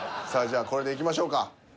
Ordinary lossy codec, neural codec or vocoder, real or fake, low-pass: none; none; real; none